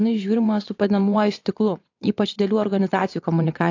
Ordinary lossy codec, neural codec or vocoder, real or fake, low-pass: AAC, 48 kbps; vocoder, 22.05 kHz, 80 mel bands, WaveNeXt; fake; 7.2 kHz